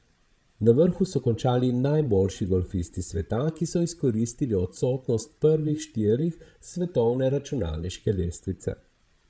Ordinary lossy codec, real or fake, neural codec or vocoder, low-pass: none; fake; codec, 16 kHz, 16 kbps, FreqCodec, larger model; none